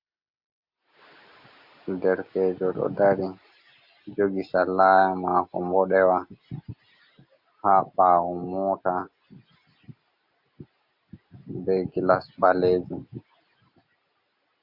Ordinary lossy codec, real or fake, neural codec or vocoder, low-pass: AAC, 48 kbps; real; none; 5.4 kHz